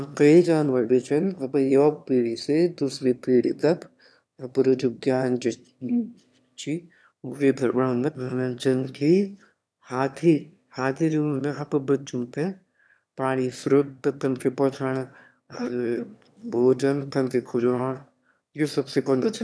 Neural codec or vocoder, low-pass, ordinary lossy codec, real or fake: autoencoder, 22.05 kHz, a latent of 192 numbers a frame, VITS, trained on one speaker; none; none; fake